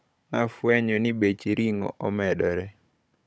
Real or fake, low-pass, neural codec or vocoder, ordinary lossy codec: fake; none; codec, 16 kHz, 16 kbps, FunCodec, trained on Chinese and English, 50 frames a second; none